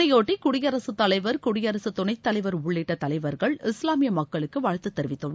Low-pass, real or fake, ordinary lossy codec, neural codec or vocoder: none; real; none; none